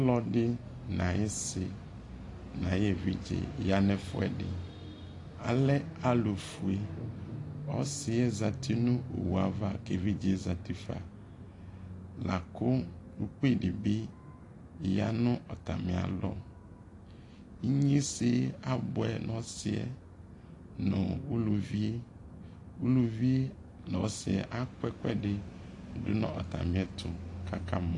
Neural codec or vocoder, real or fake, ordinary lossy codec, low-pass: none; real; AAC, 48 kbps; 10.8 kHz